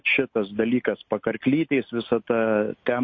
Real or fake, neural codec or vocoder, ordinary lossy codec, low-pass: real; none; MP3, 32 kbps; 7.2 kHz